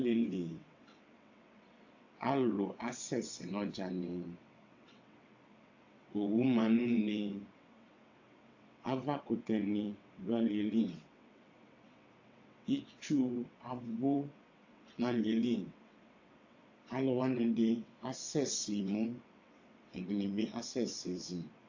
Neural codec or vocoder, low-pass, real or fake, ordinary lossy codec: vocoder, 22.05 kHz, 80 mel bands, WaveNeXt; 7.2 kHz; fake; AAC, 48 kbps